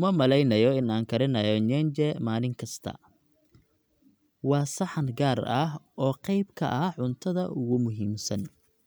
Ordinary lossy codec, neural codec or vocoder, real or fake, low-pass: none; none; real; none